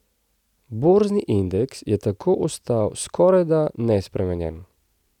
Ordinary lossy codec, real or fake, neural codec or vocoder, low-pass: none; real; none; 19.8 kHz